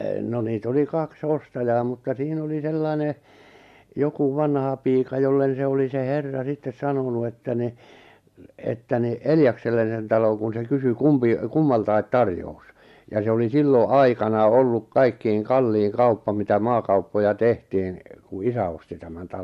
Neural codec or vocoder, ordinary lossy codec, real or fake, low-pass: none; MP3, 64 kbps; real; 14.4 kHz